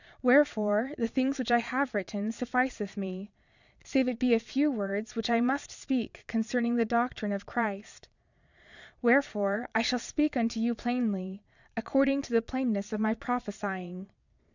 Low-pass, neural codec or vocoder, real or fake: 7.2 kHz; vocoder, 22.05 kHz, 80 mel bands, Vocos; fake